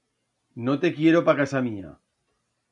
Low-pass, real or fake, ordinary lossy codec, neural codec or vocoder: 10.8 kHz; real; MP3, 96 kbps; none